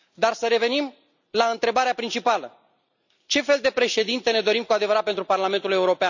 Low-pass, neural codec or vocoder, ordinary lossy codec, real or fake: 7.2 kHz; none; none; real